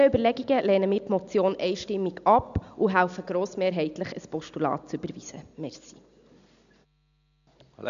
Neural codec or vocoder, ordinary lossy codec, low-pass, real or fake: none; none; 7.2 kHz; real